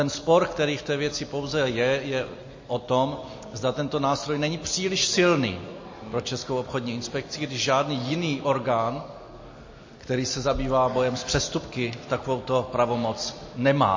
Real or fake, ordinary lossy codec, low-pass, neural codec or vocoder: real; MP3, 32 kbps; 7.2 kHz; none